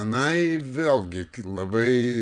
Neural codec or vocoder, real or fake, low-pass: vocoder, 22.05 kHz, 80 mel bands, WaveNeXt; fake; 9.9 kHz